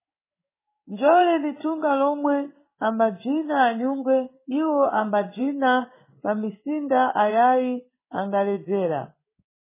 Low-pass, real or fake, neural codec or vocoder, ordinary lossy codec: 3.6 kHz; fake; codec, 16 kHz in and 24 kHz out, 1 kbps, XY-Tokenizer; MP3, 16 kbps